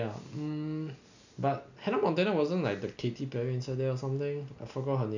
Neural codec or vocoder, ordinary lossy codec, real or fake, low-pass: none; none; real; 7.2 kHz